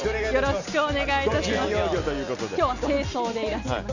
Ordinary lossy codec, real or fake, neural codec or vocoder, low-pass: none; real; none; 7.2 kHz